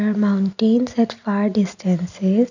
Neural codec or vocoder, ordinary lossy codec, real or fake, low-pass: none; none; real; 7.2 kHz